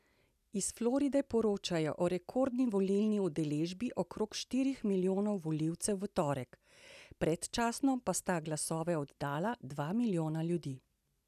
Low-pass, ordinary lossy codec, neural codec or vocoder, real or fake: 14.4 kHz; none; none; real